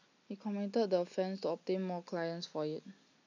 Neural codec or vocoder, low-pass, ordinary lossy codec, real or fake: none; 7.2 kHz; none; real